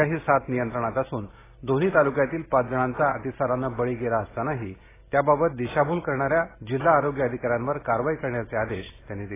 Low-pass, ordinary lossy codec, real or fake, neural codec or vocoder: 3.6 kHz; AAC, 16 kbps; real; none